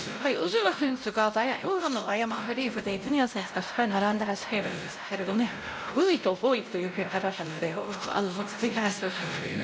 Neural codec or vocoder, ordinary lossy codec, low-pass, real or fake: codec, 16 kHz, 0.5 kbps, X-Codec, WavLM features, trained on Multilingual LibriSpeech; none; none; fake